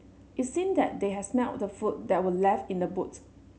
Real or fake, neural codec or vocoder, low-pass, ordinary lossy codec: real; none; none; none